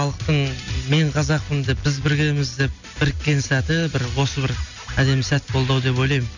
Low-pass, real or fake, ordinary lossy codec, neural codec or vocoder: 7.2 kHz; real; none; none